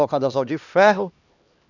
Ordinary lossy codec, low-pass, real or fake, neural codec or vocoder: none; 7.2 kHz; fake; codec, 16 kHz, 2 kbps, X-Codec, HuBERT features, trained on LibriSpeech